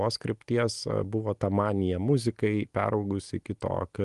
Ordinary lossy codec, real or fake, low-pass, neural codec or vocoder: Opus, 24 kbps; real; 10.8 kHz; none